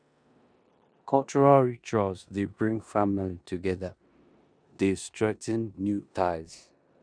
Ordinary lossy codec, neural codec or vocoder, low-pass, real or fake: none; codec, 16 kHz in and 24 kHz out, 0.9 kbps, LongCat-Audio-Codec, four codebook decoder; 9.9 kHz; fake